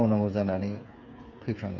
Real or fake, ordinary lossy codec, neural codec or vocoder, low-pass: fake; Opus, 64 kbps; codec, 16 kHz, 8 kbps, FreqCodec, smaller model; 7.2 kHz